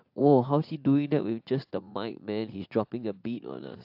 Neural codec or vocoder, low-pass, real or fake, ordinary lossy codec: vocoder, 44.1 kHz, 80 mel bands, Vocos; 5.4 kHz; fake; AAC, 48 kbps